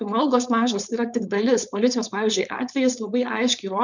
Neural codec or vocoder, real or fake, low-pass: codec, 16 kHz, 4.8 kbps, FACodec; fake; 7.2 kHz